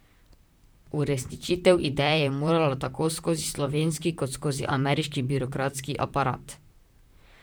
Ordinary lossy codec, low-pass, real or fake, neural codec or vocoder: none; none; fake; vocoder, 44.1 kHz, 128 mel bands, Pupu-Vocoder